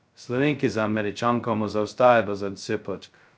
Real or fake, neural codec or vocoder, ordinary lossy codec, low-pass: fake; codec, 16 kHz, 0.2 kbps, FocalCodec; none; none